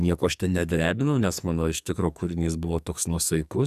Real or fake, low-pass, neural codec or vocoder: fake; 14.4 kHz; codec, 44.1 kHz, 2.6 kbps, SNAC